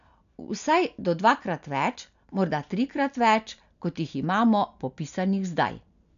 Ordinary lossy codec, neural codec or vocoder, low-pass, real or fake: none; none; 7.2 kHz; real